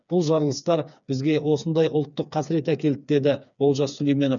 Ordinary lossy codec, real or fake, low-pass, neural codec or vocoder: none; fake; 7.2 kHz; codec, 16 kHz, 4 kbps, FreqCodec, smaller model